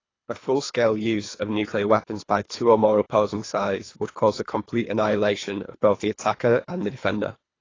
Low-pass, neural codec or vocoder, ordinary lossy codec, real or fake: 7.2 kHz; codec, 24 kHz, 3 kbps, HILCodec; AAC, 32 kbps; fake